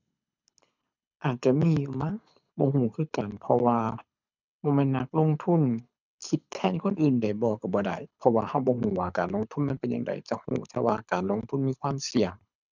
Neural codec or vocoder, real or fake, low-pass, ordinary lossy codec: codec, 24 kHz, 6 kbps, HILCodec; fake; 7.2 kHz; none